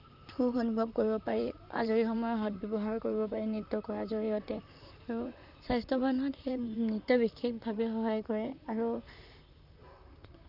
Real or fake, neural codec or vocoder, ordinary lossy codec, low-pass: fake; vocoder, 44.1 kHz, 128 mel bands, Pupu-Vocoder; none; 5.4 kHz